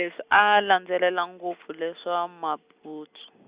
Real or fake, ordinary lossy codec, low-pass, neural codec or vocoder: real; Opus, 64 kbps; 3.6 kHz; none